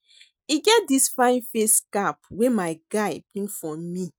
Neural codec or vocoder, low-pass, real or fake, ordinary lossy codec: none; none; real; none